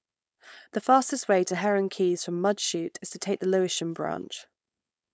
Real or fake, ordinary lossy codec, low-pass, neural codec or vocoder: fake; none; none; codec, 16 kHz, 4.8 kbps, FACodec